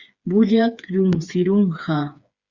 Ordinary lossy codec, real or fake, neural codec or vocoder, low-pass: Opus, 64 kbps; fake; codec, 16 kHz, 4 kbps, FreqCodec, smaller model; 7.2 kHz